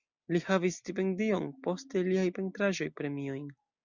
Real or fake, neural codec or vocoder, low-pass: real; none; 7.2 kHz